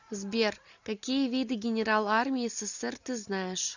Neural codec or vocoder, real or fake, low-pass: none; real; 7.2 kHz